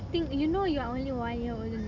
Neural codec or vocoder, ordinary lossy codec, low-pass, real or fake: none; none; 7.2 kHz; real